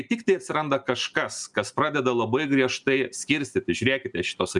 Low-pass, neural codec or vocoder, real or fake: 10.8 kHz; none; real